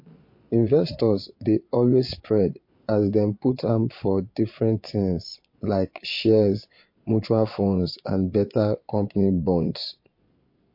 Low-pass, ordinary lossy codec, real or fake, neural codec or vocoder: 5.4 kHz; MP3, 32 kbps; fake; vocoder, 22.05 kHz, 80 mel bands, Vocos